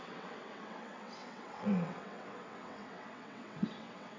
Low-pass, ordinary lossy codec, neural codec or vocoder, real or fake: 7.2 kHz; MP3, 48 kbps; vocoder, 22.05 kHz, 80 mel bands, WaveNeXt; fake